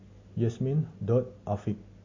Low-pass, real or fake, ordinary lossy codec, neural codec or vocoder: 7.2 kHz; real; MP3, 32 kbps; none